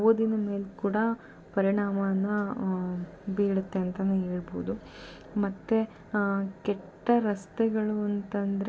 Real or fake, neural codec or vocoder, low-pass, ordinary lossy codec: real; none; none; none